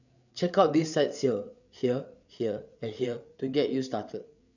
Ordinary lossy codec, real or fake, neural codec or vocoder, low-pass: none; fake; codec, 16 kHz, 8 kbps, FreqCodec, larger model; 7.2 kHz